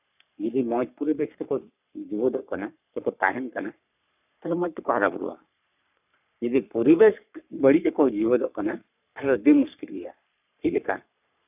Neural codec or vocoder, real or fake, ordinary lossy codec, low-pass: codec, 44.1 kHz, 3.4 kbps, Pupu-Codec; fake; none; 3.6 kHz